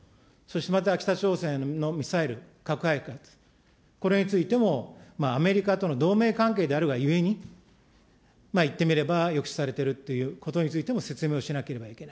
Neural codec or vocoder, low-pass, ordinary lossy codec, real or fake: none; none; none; real